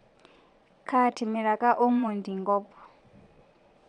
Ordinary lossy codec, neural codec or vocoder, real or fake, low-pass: none; vocoder, 22.05 kHz, 80 mel bands, Vocos; fake; 9.9 kHz